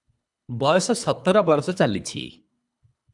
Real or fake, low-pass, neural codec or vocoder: fake; 10.8 kHz; codec, 24 kHz, 3 kbps, HILCodec